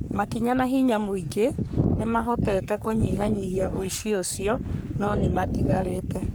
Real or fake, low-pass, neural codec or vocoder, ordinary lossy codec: fake; none; codec, 44.1 kHz, 3.4 kbps, Pupu-Codec; none